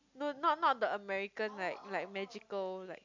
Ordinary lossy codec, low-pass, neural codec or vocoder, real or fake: MP3, 64 kbps; 7.2 kHz; none; real